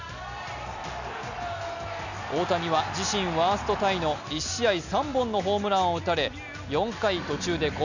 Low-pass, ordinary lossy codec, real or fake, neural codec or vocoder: 7.2 kHz; none; real; none